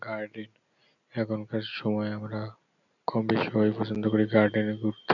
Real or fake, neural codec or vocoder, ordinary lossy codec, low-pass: real; none; none; 7.2 kHz